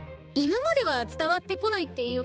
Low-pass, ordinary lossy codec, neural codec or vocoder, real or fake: none; none; codec, 16 kHz, 2 kbps, X-Codec, HuBERT features, trained on balanced general audio; fake